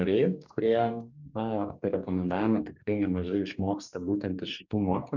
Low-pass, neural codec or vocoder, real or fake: 7.2 kHz; codec, 44.1 kHz, 2.6 kbps, DAC; fake